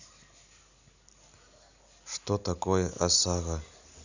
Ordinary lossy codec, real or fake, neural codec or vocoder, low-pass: none; real; none; 7.2 kHz